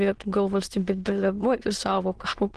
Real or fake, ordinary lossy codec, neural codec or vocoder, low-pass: fake; Opus, 16 kbps; autoencoder, 22.05 kHz, a latent of 192 numbers a frame, VITS, trained on many speakers; 9.9 kHz